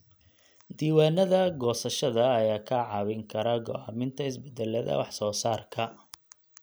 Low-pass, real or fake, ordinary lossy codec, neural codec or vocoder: none; real; none; none